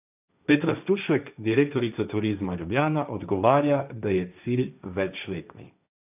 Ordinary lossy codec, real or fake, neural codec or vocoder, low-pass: none; fake; codec, 16 kHz, 1.1 kbps, Voila-Tokenizer; 3.6 kHz